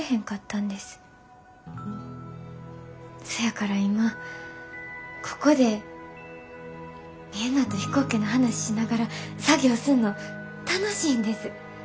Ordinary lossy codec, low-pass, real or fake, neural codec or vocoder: none; none; real; none